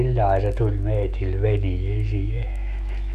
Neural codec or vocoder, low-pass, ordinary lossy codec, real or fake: autoencoder, 48 kHz, 128 numbers a frame, DAC-VAE, trained on Japanese speech; 14.4 kHz; none; fake